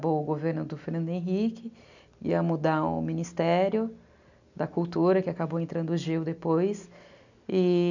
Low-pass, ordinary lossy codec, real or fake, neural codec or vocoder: 7.2 kHz; none; real; none